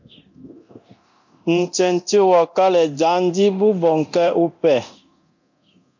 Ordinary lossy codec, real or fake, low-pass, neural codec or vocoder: MP3, 64 kbps; fake; 7.2 kHz; codec, 24 kHz, 0.9 kbps, DualCodec